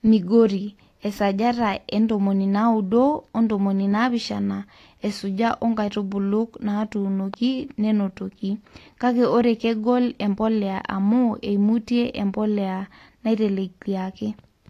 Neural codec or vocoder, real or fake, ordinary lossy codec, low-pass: none; real; AAC, 48 kbps; 14.4 kHz